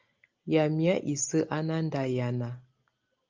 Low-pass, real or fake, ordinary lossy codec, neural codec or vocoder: 7.2 kHz; real; Opus, 32 kbps; none